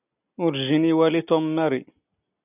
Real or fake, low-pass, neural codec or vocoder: real; 3.6 kHz; none